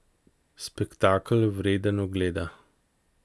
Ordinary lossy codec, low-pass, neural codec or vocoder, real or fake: none; none; none; real